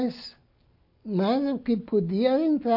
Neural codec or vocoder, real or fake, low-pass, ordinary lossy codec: none; real; 5.4 kHz; MP3, 32 kbps